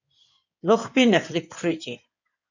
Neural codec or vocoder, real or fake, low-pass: codec, 16 kHz in and 24 kHz out, 2.2 kbps, FireRedTTS-2 codec; fake; 7.2 kHz